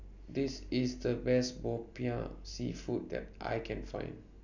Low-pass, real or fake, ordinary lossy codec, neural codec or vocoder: 7.2 kHz; real; none; none